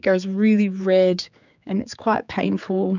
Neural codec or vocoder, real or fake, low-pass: codec, 16 kHz, 4 kbps, X-Codec, HuBERT features, trained on general audio; fake; 7.2 kHz